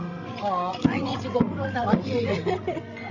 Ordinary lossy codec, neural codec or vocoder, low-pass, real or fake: none; codec, 16 kHz, 16 kbps, FreqCodec, larger model; 7.2 kHz; fake